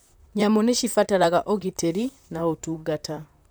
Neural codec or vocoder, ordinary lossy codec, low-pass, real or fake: vocoder, 44.1 kHz, 128 mel bands, Pupu-Vocoder; none; none; fake